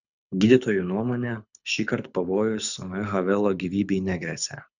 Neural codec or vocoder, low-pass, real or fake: codec, 24 kHz, 6 kbps, HILCodec; 7.2 kHz; fake